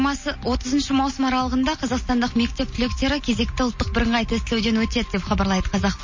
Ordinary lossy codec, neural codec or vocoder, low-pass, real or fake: MP3, 32 kbps; none; 7.2 kHz; real